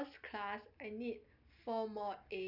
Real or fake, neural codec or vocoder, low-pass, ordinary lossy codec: real; none; 5.4 kHz; none